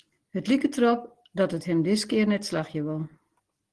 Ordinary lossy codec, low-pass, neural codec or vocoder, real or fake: Opus, 16 kbps; 10.8 kHz; none; real